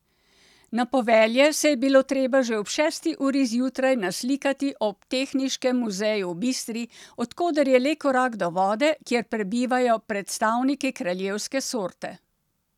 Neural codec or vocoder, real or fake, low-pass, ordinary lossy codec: none; real; 19.8 kHz; none